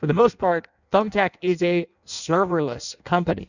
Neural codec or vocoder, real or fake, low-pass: codec, 16 kHz in and 24 kHz out, 0.6 kbps, FireRedTTS-2 codec; fake; 7.2 kHz